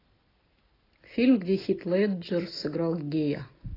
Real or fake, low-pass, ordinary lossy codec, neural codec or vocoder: real; 5.4 kHz; AAC, 24 kbps; none